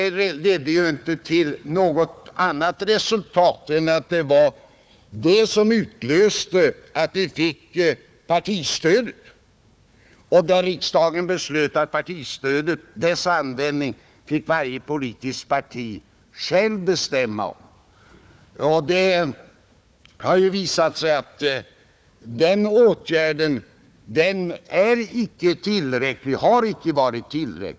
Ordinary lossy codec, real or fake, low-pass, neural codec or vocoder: none; fake; none; codec, 16 kHz, 4 kbps, FunCodec, trained on Chinese and English, 50 frames a second